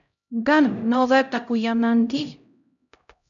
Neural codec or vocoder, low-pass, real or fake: codec, 16 kHz, 0.5 kbps, X-Codec, HuBERT features, trained on LibriSpeech; 7.2 kHz; fake